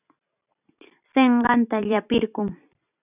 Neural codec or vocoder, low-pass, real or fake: vocoder, 44.1 kHz, 128 mel bands every 256 samples, BigVGAN v2; 3.6 kHz; fake